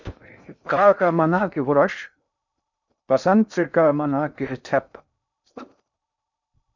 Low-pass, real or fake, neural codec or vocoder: 7.2 kHz; fake; codec, 16 kHz in and 24 kHz out, 0.6 kbps, FocalCodec, streaming, 2048 codes